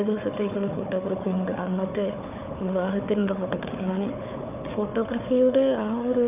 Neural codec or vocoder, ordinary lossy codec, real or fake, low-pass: codec, 16 kHz, 4 kbps, FunCodec, trained on Chinese and English, 50 frames a second; none; fake; 3.6 kHz